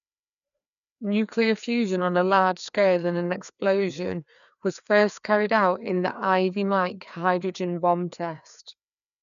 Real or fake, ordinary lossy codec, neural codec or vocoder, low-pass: fake; none; codec, 16 kHz, 2 kbps, FreqCodec, larger model; 7.2 kHz